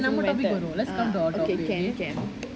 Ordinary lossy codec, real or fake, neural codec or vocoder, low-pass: none; real; none; none